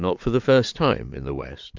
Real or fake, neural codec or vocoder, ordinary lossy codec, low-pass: fake; vocoder, 22.05 kHz, 80 mel bands, Vocos; MP3, 64 kbps; 7.2 kHz